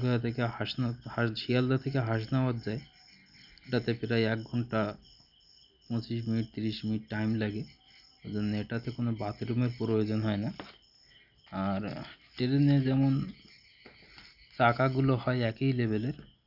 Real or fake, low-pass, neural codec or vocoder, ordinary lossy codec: real; 5.4 kHz; none; none